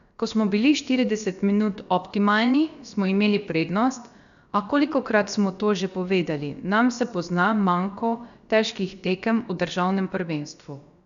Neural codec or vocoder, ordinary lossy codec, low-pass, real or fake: codec, 16 kHz, about 1 kbps, DyCAST, with the encoder's durations; none; 7.2 kHz; fake